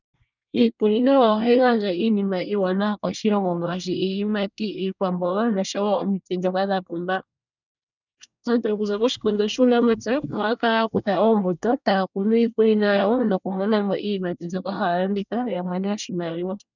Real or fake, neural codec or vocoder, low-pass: fake; codec, 24 kHz, 1 kbps, SNAC; 7.2 kHz